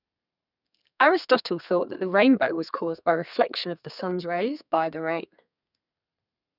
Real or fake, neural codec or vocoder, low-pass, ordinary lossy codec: fake; codec, 32 kHz, 1.9 kbps, SNAC; 5.4 kHz; none